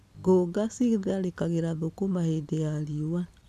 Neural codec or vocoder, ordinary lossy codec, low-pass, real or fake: none; none; 14.4 kHz; real